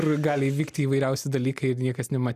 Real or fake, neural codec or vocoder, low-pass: real; none; 14.4 kHz